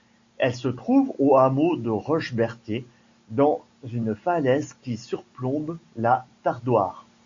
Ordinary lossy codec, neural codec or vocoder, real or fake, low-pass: AAC, 64 kbps; none; real; 7.2 kHz